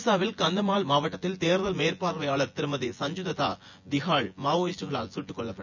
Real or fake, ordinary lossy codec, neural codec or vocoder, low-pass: fake; none; vocoder, 24 kHz, 100 mel bands, Vocos; 7.2 kHz